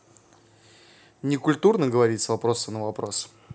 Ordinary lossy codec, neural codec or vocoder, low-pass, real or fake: none; none; none; real